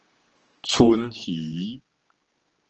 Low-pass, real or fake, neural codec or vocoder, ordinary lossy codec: 7.2 kHz; fake; codec, 16 kHz, 2 kbps, X-Codec, HuBERT features, trained on general audio; Opus, 16 kbps